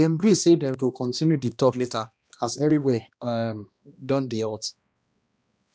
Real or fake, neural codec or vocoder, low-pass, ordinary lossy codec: fake; codec, 16 kHz, 1 kbps, X-Codec, HuBERT features, trained on balanced general audio; none; none